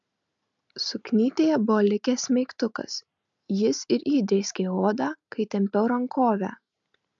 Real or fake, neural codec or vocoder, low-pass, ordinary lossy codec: real; none; 7.2 kHz; MP3, 64 kbps